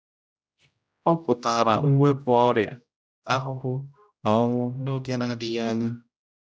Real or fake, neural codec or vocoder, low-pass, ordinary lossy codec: fake; codec, 16 kHz, 0.5 kbps, X-Codec, HuBERT features, trained on general audio; none; none